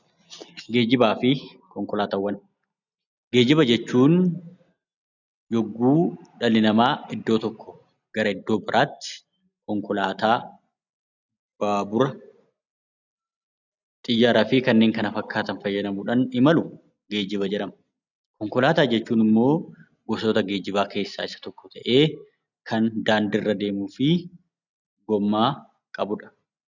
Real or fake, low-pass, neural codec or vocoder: real; 7.2 kHz; none